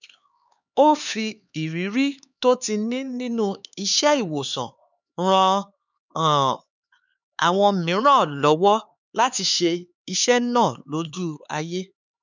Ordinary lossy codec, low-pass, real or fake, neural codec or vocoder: none; 7.2 kHz; fake; codec, 16 kHz, 4 kbps, X-Codec, HuBERT features, trained on LibriSpeech